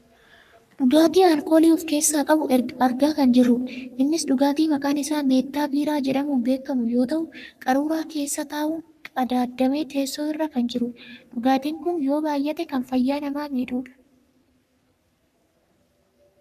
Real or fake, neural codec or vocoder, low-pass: fake; codec, 44.1 kHz, 3.4 kbps, Pupu-Codec; 14.4 kHz